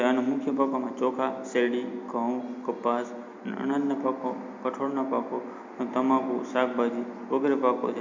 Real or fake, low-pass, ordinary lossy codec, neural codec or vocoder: real; 7.2 kHz; MP3, 48 kbps; none